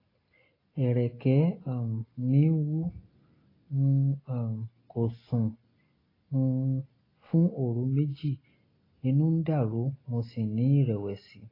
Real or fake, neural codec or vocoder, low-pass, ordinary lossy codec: real; none; 5.4 kHz; AAC, 24 kbps